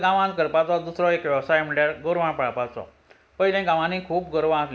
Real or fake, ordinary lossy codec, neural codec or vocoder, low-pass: real; none; none; none